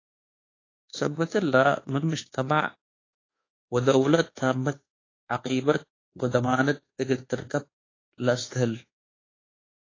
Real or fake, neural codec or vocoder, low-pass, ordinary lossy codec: fake; codec, 24 kHz, 1.2 kbps, DualCodec; 7.2 kHz; AAC, 32 kbps